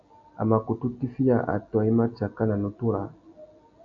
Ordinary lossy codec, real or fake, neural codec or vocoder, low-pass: MP3, 48 kbps; real; none; 7.2 kHz